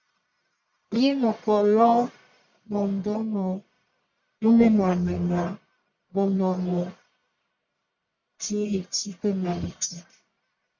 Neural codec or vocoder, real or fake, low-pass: codec, 44.1 kHz, 1.7 kbps, Pupu-Codec; fake; 7.2 kHz